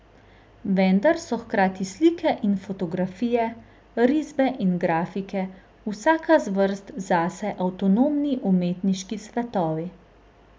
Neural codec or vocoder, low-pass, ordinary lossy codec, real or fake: none; none; none; real